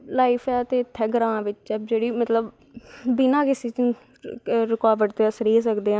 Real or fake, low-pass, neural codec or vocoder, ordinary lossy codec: real; none; none; none